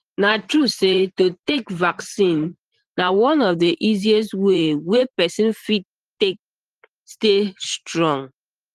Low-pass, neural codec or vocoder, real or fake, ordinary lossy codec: 14.4 kHz; vocoder, 44.1 kHz, 128 mel bands every 512 samples, BigVGAN v2; fake; Opus, 24 kbps